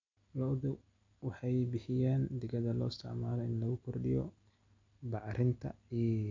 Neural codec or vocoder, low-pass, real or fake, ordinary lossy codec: none; 7.2 kHz; real; MP3, 64 kbps